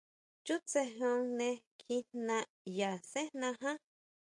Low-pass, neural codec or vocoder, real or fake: 10.8 kHz; none; real